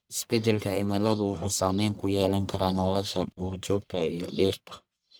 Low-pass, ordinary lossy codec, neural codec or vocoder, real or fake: none; none; codec, 44.1 kHz, 1.7 kbps, Pupu-Codec; fake